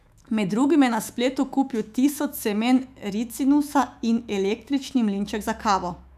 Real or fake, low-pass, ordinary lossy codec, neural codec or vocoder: fake; 14.4 kHz; none; autoencoder, 48 kHz, 128 numbers a frame, DAC-VAE, trained on Japanese speech